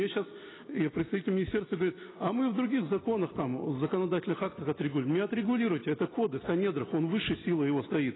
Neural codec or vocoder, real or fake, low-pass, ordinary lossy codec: none; real; 7.2 kHz; AAC, 16 kbps